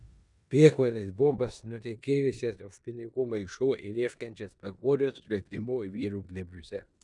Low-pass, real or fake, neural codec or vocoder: 10.8 kHz; fake; codec, 16 kHz in and 24 kHz out, 0.9 kbps, LongCat-Audio-Codec, four codebook decoder